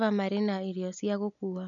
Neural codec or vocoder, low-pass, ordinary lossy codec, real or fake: none; 7.2 kHz; none; real